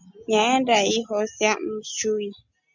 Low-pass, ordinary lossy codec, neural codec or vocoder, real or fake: 7.2 kHz; MP3, 64 kbps; none; real